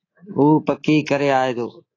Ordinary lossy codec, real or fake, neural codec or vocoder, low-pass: AAC, 32 kbps; fake; codec, 24 kHz, 3.1 kbps, DualCodec; 7.2 kHz